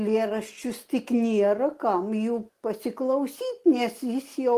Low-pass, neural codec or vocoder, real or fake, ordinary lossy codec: 14.4 kHz; none; real; Opus, 24 kbps